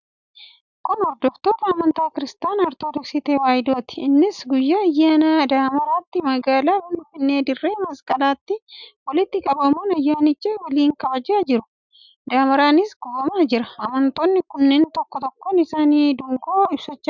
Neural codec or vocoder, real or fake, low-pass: none; real; 7.2 kHz